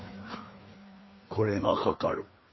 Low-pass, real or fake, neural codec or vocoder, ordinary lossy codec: 7.2 kHz; fake; codec, 16 kHz, 2 kbps, FreqCodec, larger model; MP3, 24 kbps